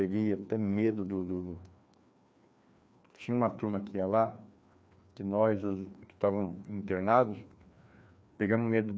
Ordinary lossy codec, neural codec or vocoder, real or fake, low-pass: none; codec, 16 kHz, 2 kbps, FreqCodec, larger model; fake; none